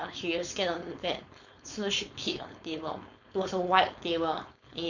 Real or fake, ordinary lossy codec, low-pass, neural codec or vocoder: fake; none; 7.2 kHz; codec, 16 kHz, 4.8 kbps, FACodec